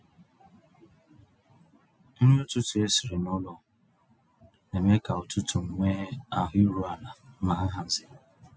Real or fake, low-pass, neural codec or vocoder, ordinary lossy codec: real; none; none; none